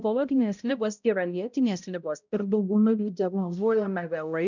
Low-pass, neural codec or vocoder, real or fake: 7.2 kHz; codec, 16 kHz, 0.5 kbps, X-Codec, HuBERT features, trained on balanced general audio; fake